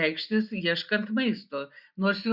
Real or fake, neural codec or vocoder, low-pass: real; none; 5.4 kHz